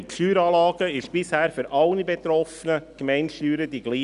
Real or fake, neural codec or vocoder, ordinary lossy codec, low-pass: real; none; none; 10.8 kHz